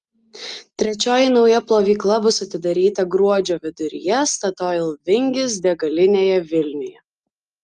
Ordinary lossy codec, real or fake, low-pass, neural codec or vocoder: Opus, 32 kbps; real; 9.9 kHz; none